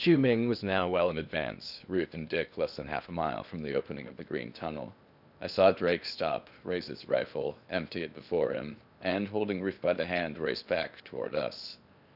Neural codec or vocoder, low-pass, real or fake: codec, 16 kHz in and 24 kHz out, 0.8 kbps, FocalCodec, streaming, 65536 codes; 5.4 kHz; fake